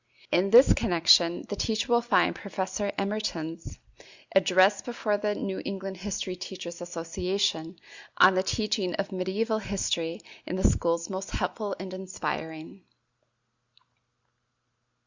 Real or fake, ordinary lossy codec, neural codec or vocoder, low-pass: real; Opus, 64 kbps; none; 7.2 kHz